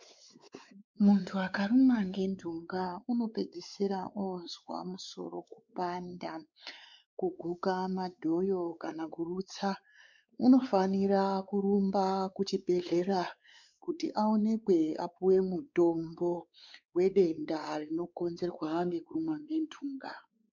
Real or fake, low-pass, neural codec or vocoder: fake; 7.2 kHz; codec, 16 kHz, 4 kbps, X-Codec, WavLM features, trained on Multilingual LibriSpeech